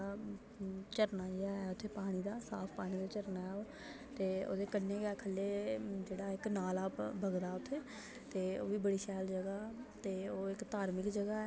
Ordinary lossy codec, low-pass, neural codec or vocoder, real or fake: none; none; none; real